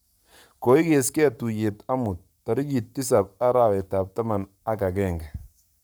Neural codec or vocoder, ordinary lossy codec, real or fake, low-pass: codec, 44.1 kHz, 7.8 kbps, Pupu-Codec; none; fake; none